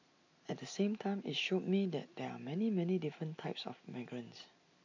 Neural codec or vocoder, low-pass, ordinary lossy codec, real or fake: none; 7.2 kHz; AAC, 48 kbps; real